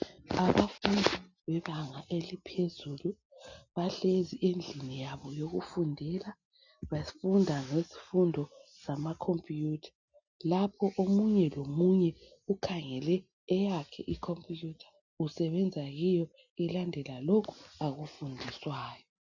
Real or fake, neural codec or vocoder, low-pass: real; none; 7.2 kHz